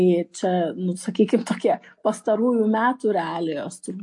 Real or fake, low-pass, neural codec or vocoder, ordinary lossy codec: real; 10.8 kHz; none; MP3, 48 kbps